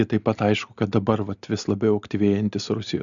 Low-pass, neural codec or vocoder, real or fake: 7.2 kHz; none; real